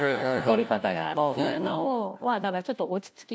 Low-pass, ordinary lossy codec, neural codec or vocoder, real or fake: none; none; codec, 16 kHz, 1 kbps, FunCodec, trained on LibriTTS, 50 frames a second; fake